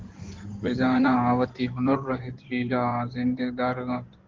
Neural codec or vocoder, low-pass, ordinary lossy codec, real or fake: codec, 16 kHz in and 24 kHz out, 2.2 kbps, FireRedTTS-2 codec; 7.2 kHz; Opus, 16 kbps; fake